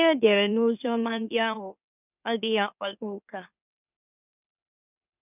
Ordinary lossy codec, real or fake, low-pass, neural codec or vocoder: none; fake; 3.6 kHz; autoencoder, 44.1 kHz, a latent of 192 numbers a frame, MeloTTS